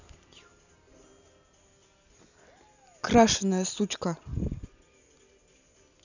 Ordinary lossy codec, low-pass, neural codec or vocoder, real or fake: none; 7.2 kHz; none; real